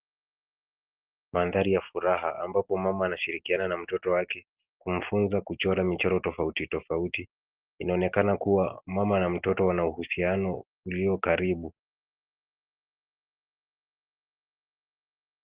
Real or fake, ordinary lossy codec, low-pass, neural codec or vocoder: real; Opus, 16 kbps; 3.6 kHz; none